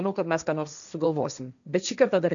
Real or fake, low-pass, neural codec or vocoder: fake; 7.2 kHz; codec, 16 kHz, 1.1 kbps, Voila-Tokenizer